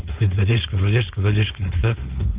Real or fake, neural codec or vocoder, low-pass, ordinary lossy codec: fake; codec, 16 kHz, 4.8 kbps, FACodec; 3.6 kHz; Opus, 24 kbps